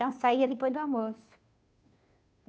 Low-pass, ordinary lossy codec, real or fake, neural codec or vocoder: none; none; fake; codec, 16 kHz, 2 kbps, FunCodec, trained on Chinese and English, 25 frames a second